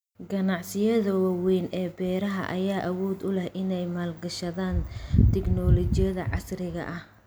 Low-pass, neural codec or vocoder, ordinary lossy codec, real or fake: none; none; none; real